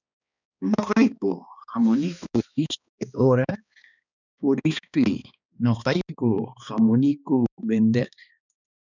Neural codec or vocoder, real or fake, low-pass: codec, 16 kHz, 2 kbps, X-Codec, HuBERT features, trained on balanced general audio; fake; 7.2 kHz